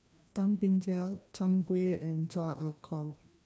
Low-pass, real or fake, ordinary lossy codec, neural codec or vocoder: none; fake; none; codec, 16 kHz, 1 kbps, FreqCodec, larger model